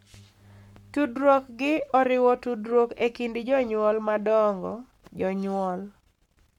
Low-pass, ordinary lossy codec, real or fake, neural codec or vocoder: 19.8 kHz; MP3, 96 kbps; fake; codec, 44.1 kHz, 7.8 kbps, Pupu-Codec